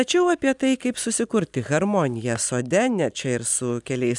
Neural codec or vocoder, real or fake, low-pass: vocoder, 44.1 kHz, 128 mel bands every 256 samples, BigVGAN v2; fake; 10.8 kHz